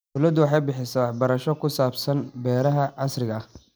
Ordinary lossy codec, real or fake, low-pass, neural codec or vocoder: none; real; none; none